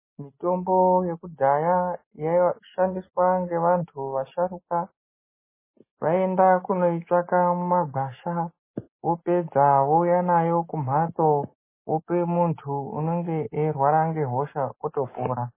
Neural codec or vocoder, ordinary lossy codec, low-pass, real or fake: none; MP3, 16 kbps; 3.6 kHz; real